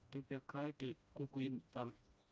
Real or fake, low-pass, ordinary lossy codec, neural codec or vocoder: fake; none; none; codec, 16 kHz, 1 kbps, FreqCodec, smaller model